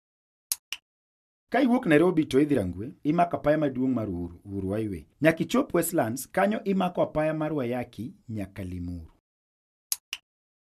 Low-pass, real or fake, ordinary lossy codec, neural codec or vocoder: 14.4 kHz; real; none; none